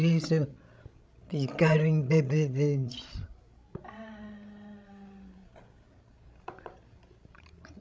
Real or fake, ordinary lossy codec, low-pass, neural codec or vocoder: fake; none; none; codec, 16 kHz, 16 kbps, FreqCodec, larger model